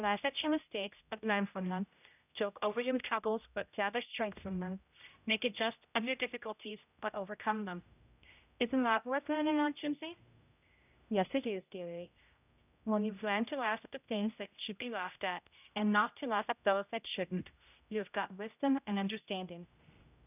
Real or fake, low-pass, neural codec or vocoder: fake; 3.6 kHz; codec, 16 kHz, 0.5 kbps, X-Codec, HuBERT features, trained on general audio